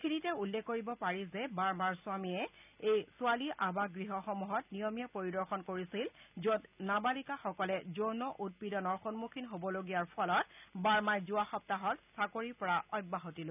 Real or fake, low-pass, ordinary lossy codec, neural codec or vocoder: real; 3.6 kHz; none; none